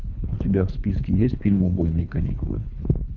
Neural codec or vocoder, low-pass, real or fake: codec, 24 kHz, 3 kbps, HILCodec; 7.2 kHz; fake